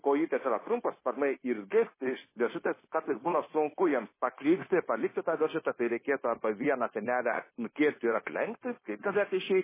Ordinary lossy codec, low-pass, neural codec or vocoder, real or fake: MP3, 16 kbps; 3.6 kHz; codec, 16 kHz, 0.9 kbps, LongCat-Audio-Codec; fake